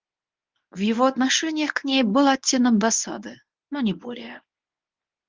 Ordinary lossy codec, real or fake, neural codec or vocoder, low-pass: Opus, 24 kbps; fake; codec, 24 kHz, 0.9 kbps, WavTokenizer, medium speech release version 1; 7.2 kHz